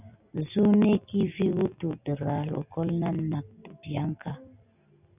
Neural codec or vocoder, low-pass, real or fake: none; 3.6 kHz; real